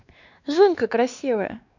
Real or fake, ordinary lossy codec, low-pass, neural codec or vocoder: fake; none; 7.2 kHz; codec, 16 kHz, 2 kbps, X-Codec, WavLM features, trained on Multilingual LibriSpeech